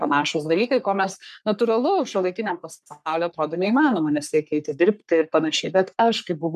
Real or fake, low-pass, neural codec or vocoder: fake; 14.4 kHz; codec, 44.1 kHz, 3.4 kbps, Pupu-Codec